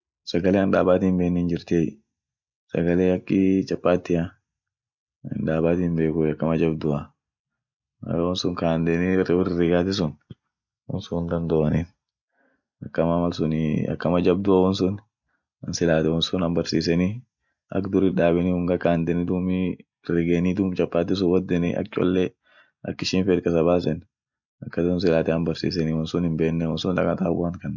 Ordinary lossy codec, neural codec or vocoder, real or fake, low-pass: none; none; real; 7.2 kHz